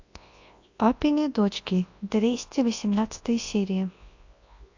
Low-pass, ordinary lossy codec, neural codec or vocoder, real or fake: 7.2 kHz; AAC, 48 kbps; codec, 24 kHz, 0.9 kbps, WavTokenizer, large speech release; fake